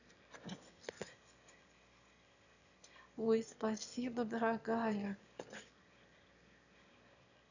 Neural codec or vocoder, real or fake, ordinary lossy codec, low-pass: autoencoder, 22.05 kHz, a latent of 192 numbers a frame, VITS, trained on one speaker; fake; none; 7.2 kHz